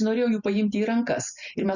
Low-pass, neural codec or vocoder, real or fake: 7.2 kHz; none; real